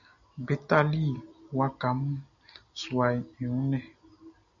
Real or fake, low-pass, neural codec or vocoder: real; 7.2 kHz; none